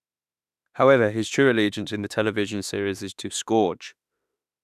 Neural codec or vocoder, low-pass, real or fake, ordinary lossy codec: autoencoder, 48 kHz, 32 numbers a frame, DAC-VAE, trained on Japanese speech; 14.4 kHz; fake; none